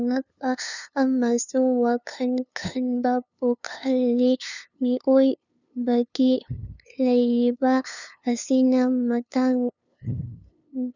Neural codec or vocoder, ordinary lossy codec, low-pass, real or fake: codec, 16 kHz, 2 kbps, FunCodec, trained on LibriTTS, 25 frames a second; none; 7.2 kHz; fake